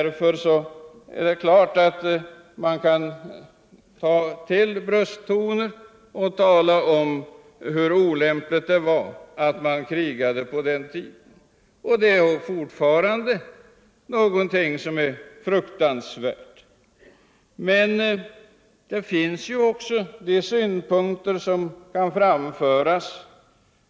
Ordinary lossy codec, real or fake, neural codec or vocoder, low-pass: none; real; none; none